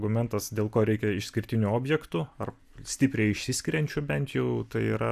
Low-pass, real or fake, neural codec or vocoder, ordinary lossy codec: 14.4 kHz; real; none; AAC, 96 kbps